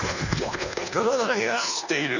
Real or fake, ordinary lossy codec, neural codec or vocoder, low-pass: fake; none; codec, 24 kHz, 1.2 kbps, DualCodec; 7.2 kHz